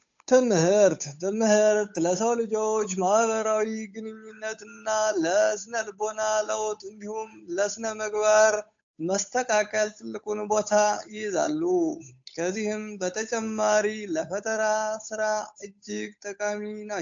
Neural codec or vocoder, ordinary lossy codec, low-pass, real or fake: codec, 16 kHz, 8 kbps, FunCodec, trained on Chinese and English, 25 frames a second; AAC, 64 kbps; 7.2 kHz; fake